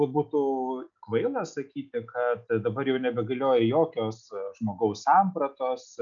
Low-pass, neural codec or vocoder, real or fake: 7.2 kHz; none; real